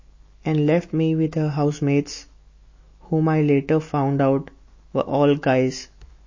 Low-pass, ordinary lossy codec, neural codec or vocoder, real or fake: 7.2 kHz; MP3, 32 kbps; autoencoder, 48 kHz, 128 numbers a frame, DAC-VAE, trained on Japanese speech; fake